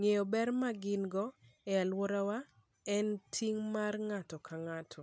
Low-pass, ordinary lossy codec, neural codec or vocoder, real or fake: none; none; none; real